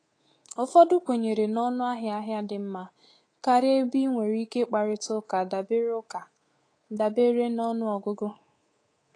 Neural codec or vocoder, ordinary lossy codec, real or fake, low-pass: codec, 24 kHz, 3.1 kbps, DualCodec; AAC, 32 kbps; fake; 9.9 kHz